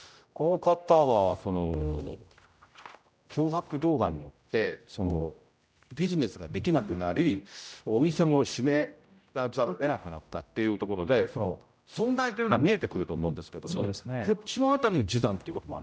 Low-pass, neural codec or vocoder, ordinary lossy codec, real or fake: none; codec, 16 kHz, 0.5 kbps, X-Codec, HuBERT features, trained on general audio; none; fake